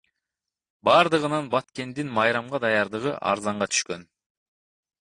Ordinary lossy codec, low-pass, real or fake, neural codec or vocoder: AAC, 48 kbps; 9.9 kHz; real; none